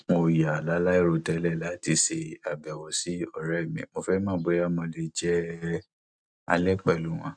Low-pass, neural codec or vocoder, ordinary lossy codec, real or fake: 9.9 kHz; none; none; real